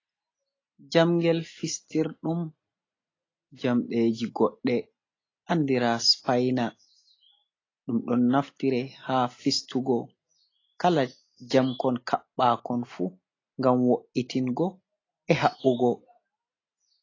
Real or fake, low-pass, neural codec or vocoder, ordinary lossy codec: real; 7.2 kHz; none; AAC, 32 kbps